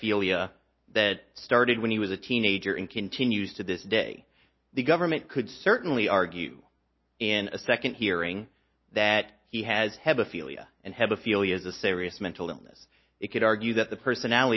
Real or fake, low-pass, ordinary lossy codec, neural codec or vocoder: real; 7.2 kHz; MP3, 24 kbps; none